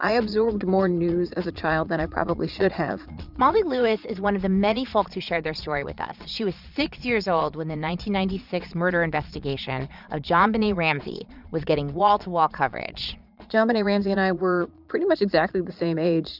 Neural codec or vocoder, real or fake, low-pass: vocoder, 22.05 kHz, 80 mel bands, Vocos; fake; 5.4 kHz